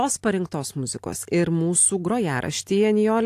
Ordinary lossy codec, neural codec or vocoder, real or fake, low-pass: AAC, 64 kbps; none; real; 14.4 kHz